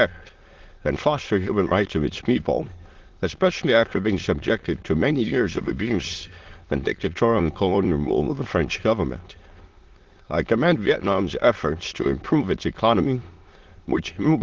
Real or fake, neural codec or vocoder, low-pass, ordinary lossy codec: fake; autoencoder, 22.05 kHz, a latent of 192 numbers a frame, VITS, trained on many speakers; 7.2 kHz; Opus, 16 kbps